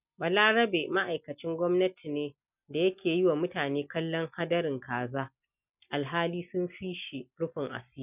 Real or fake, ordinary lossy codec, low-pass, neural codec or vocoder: real; none; 3.6 kHz; none